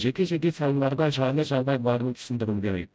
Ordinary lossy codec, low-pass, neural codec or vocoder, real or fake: none; none; codec, 16 kHz, 0.5 kbps, FreqCodec, smaller model; fake